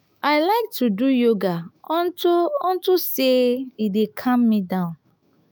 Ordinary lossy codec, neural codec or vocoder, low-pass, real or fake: none; autoencoder, 48 kHz, 128 numbers a frame, DAC-VAE, trained on Japanese speech; none; fake